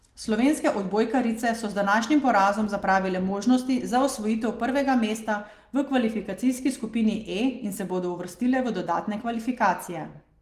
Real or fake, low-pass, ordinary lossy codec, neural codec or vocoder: real; 14.4 kHz; Opus, 24 kbps; none